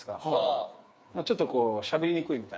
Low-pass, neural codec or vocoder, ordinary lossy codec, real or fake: none; codec, 16 kHz, 4 kbps, FreqCodec, smaller model; none; fake